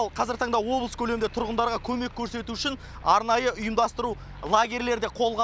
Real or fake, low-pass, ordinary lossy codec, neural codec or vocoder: real; none; none; none